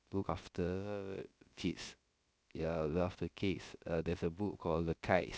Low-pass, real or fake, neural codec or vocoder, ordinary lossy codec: none; fake; codec, 16 kHz, about 1 kbps, DyCAST, with the encoder's durations; none